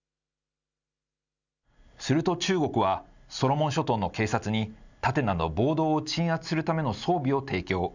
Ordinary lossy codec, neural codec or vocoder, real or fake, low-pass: none; none; real; 7.2 kHz